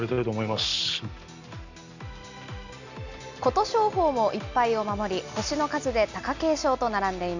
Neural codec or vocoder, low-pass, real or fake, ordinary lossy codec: none; 7.2 kHz; real; none